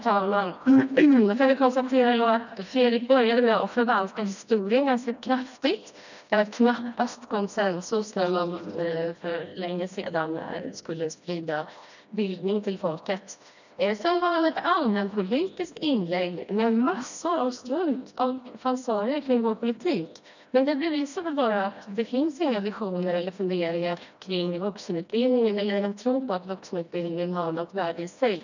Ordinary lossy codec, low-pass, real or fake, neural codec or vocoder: none; 7.2 kHz; fake; codec, 16 kHz, 1 kbps, FreqCodec, smaller model